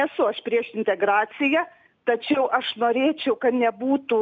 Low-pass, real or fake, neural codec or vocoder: 7.2 kHz; real; none